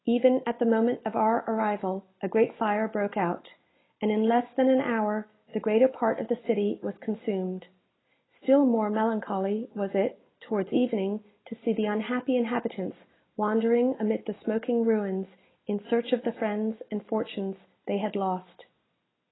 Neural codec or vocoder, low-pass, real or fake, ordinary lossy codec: none; 7.2 kHz; real; AAC, 16 kbps